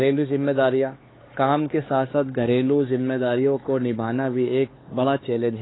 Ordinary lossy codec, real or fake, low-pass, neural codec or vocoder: AAC, 16 kbps; fake; 7.2 kHz; codec, 16 kHz, 4 kbps, X-Codec, HuBERT features, trained on LibriSpeech